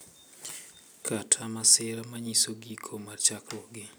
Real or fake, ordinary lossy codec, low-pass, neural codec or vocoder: real; none; none; none